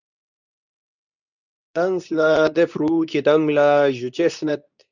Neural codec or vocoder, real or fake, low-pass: codec, 24 kHz, 0.9 kbps, WavTokenizer, medium speech release version 2; fake; 7.2 kHz